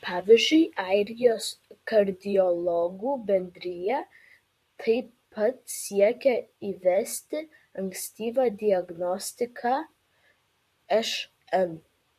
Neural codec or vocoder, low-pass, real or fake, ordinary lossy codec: vocoder, 44.1 kHz, 128 mel bands, Pupu-Vocoder; 14.4 kHz; fake; MP3, 64 kbps